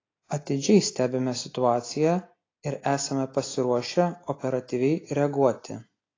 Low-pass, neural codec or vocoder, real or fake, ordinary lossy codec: 7.2 kHz; none; real; AAC, 32 kbps